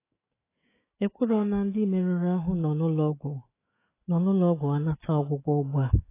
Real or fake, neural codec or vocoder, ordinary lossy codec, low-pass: fake; autoencoder, 48 kHz, 128 numbers a frame, DAC-VAE, trained on Japanese speech; AAC, 16 kbps; 3.6 kHz